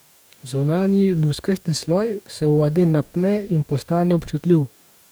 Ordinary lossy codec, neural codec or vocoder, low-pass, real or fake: none; codec, 44.1 kHz, 2.6 kbps, DAC; none; fake